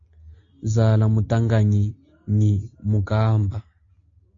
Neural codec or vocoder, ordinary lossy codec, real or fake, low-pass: none; AAC, 48 kbps; real; 7.2 kHz